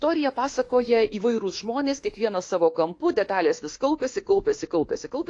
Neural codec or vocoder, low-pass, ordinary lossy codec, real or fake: autoencoder, 48 kHz, 32 numbers a frame, DAC-VAE, trained on Japanese speech; 10.8 kHz; AAC, 48 kbps; fake